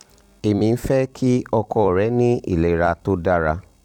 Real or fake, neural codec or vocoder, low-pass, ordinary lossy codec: fake; vocoder, 44.1 kHz, 128 mel bands every 256 samples, BigVGAN v2; 19.8 kHz; none